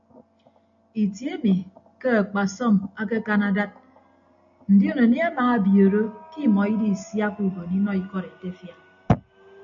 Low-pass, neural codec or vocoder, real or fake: 7.2 kHz; none; real